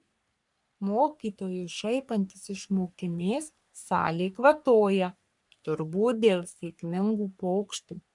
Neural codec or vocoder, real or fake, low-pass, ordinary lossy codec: codec, 44.1 kHz, 3.4 kbps, Pupu-Codec; fake; 10.8 kHz; MP3, 96 kbps